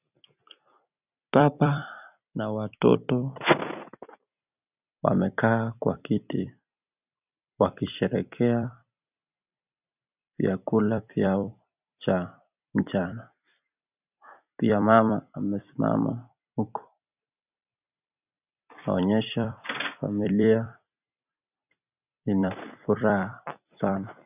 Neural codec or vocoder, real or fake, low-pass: vocoder, 44.1 kHz, 128 mel bands every 256 samples, BigVGAN v2; fake; 3.6 kHz